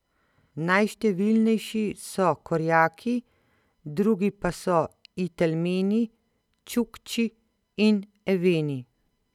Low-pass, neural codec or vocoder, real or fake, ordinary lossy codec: 19.8 kHz; none; real; none